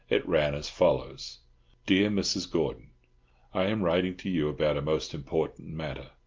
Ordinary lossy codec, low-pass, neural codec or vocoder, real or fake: Opus, 24 kbps; 7.2 kHz; none; real